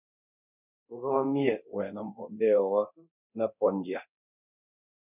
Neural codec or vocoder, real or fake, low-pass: codec, 24 kHz, 0.9 kbps, DualCodec; fake; 3.6 kHz